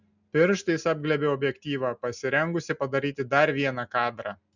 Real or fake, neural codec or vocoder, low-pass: real; none; 7.2 kHz